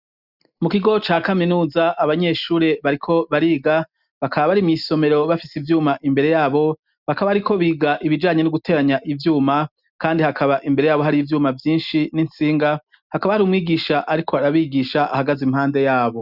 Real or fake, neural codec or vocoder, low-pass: real; none; 5.4 kHz